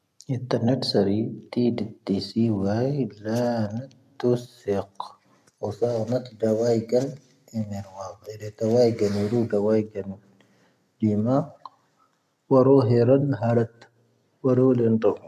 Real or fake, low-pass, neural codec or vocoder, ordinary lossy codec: real; 14.4 kHz; none; none